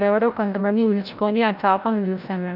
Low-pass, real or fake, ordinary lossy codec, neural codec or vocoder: 5.4 kHz; fake; none; codec, 16 kHz, 0.5 kbps, FreqCodec, larger model